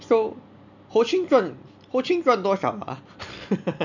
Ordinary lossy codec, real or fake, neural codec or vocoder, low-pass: none; real; none; 7.2 kHz